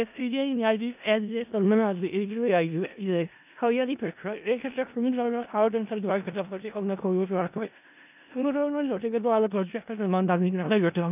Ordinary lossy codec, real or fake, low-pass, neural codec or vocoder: none; fake; 3.6 kHz; codec, 16 kHz in and 24 kHz out, 0.4 kbps, LongCat-Audio-Codec, four codebook decoder